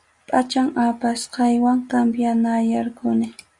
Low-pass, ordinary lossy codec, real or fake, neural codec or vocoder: 10.8 kHz; Opus, 64 kbps; real; none